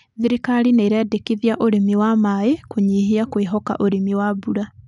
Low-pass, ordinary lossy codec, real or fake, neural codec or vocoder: 10.8 kHz; none; real; none